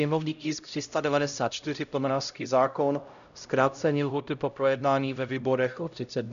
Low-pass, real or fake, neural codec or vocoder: 7.2 kHz; fake; codec, 16 kHz, 0.5 kbps, X-Codec, HuBERT features, trained on LibriSpeech